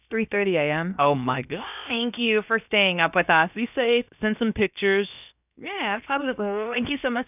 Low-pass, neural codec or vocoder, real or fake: 3.6 kHz; codec, 16 kHz, about 1 kbps, DyCAST, with the encoder's durations; fake